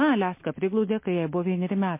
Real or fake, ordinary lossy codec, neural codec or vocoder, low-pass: real; MP3, 24 kbps; none; 3.6 kHz